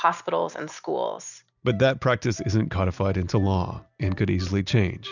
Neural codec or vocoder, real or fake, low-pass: none; real; 7.2 kHz